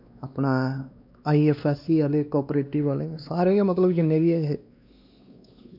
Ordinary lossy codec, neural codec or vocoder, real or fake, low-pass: MP3, 48 kbps; codec, 16 kHz, 2 kbps, X-Codec, WavLM features, trained on Multilingual LibriSpeech; fake; 5.4 kHz